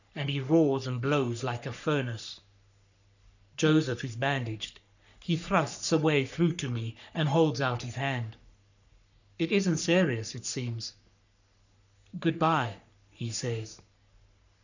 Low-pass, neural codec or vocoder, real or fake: 7.2 kHz; codec, 44.1 kHz, 3.4 kbps, Pupu-Codec; fake